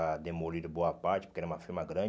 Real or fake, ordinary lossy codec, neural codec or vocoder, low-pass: real; none; none; none